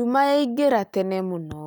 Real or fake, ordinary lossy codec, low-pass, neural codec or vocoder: real; none; none; none